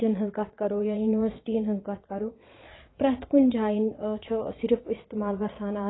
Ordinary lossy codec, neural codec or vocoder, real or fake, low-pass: AAC, 16 kbps; vocoder, 22.05 kHz, 80 mel bands, Vocos; fake; 7.2 kHz